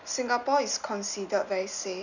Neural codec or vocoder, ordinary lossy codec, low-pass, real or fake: none; none; 7.2 kHz; real